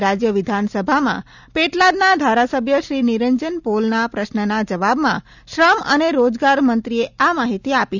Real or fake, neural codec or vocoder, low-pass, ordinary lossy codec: real; none; 7.2 kHz; none